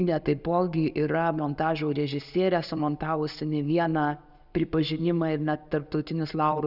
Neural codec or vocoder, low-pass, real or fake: none; 5.4 kHz; real